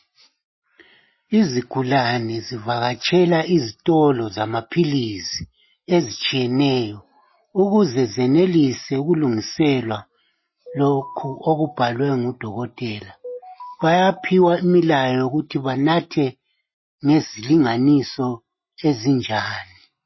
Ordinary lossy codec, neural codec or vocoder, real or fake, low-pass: MP3, 24 kbps; none; real; 7.2 kHz